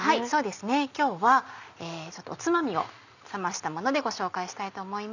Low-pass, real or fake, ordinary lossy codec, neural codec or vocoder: 7.2 kHz; real; none; none